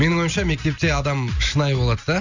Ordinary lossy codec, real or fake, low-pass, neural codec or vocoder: none; real; 7.2 kHz; none